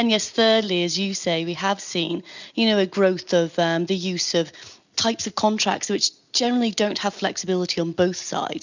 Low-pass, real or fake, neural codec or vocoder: 7.2 kHz; real; none